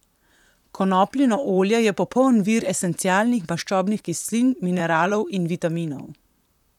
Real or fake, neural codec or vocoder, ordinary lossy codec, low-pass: fake; vocoder, 44.1 kHz, 128 mel bands, Pupu-Vocoder; none; 19.8 kHz